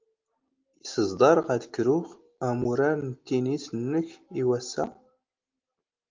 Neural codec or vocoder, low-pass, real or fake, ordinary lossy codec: none; 7.2 kHz; real; Opus, 32 kbps